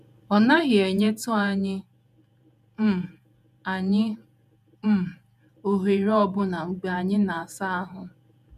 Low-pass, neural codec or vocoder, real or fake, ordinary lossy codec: 14.4 kHz; vocoder, 48 kHz, 128 mel bands, Vocos; fake; none